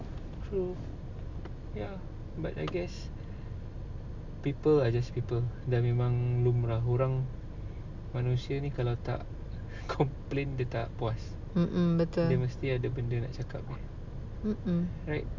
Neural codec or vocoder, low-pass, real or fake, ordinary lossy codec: none; 7.2 kHz; real; MP3, 64 kbps